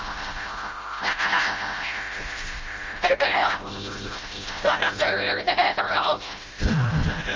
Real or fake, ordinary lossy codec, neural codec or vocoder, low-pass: fake; Opus, 32 kbps; codec, 16 kHz, 0.5 kbps, FreqCodec, smaller model; 7.2 kHz